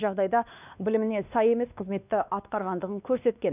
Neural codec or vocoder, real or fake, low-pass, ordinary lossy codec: codec, 16 kHz, 2 kbps, X-Codec, WavLM features, trained on Multilingual LibriSpeech; fake; 3.6 kHz; none